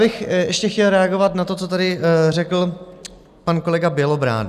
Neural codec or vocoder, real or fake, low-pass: none; real; 14.4 kHz